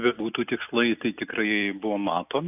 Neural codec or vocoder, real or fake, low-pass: none; real; 3.6 kHz